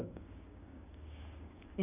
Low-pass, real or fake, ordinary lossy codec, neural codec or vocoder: 3.6 kHz; fake; none; codec, 16 kHz, 6 kbps, DAC